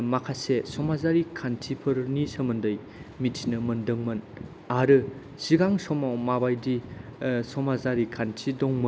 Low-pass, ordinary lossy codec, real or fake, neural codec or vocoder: none; none; real; none